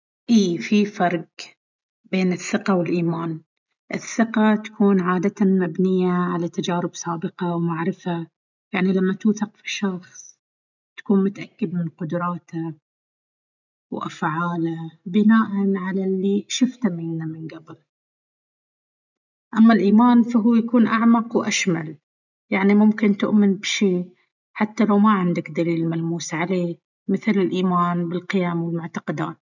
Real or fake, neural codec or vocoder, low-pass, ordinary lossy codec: real; none; 7.2 kHz; none